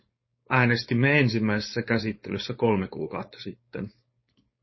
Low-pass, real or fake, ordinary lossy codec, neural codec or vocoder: 7.2 kHz; fake; MP3, 24 kbps; codec, 16 kHz, 4.8 kbps, FACodec